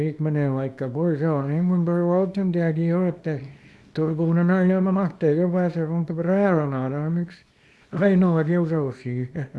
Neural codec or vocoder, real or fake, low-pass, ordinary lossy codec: codec, 24 kHz, 0.9 kbps, WavTokenizer, small release; fake; none; none